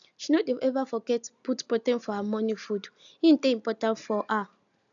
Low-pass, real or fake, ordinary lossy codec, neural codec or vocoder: 7.2 kHz; real; none; none